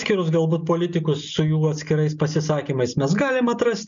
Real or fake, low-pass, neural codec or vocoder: real; 7.2 kHz; none